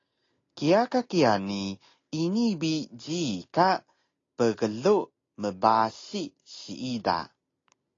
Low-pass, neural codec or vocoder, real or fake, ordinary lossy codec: 7.2 kHz; none; real; AAC, 32 kbps